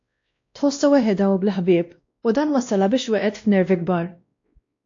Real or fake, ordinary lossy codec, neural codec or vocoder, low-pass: fake; AAC, 48 kbps; codec, 16 kHz, 1 kbps, X-Codec, WavLM features, trained on Multilingual LibriSpeech; 7.2 kHz